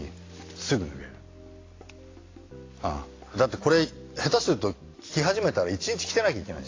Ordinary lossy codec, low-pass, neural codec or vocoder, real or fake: AAC, 32 kbps; 7.2 kHz; none; real